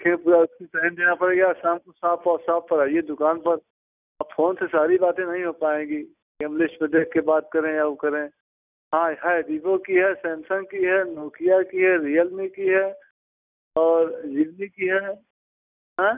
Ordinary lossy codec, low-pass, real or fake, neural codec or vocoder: none; 3.6 kHz; real; none